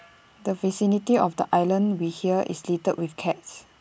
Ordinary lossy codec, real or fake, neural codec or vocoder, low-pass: none; real; none; none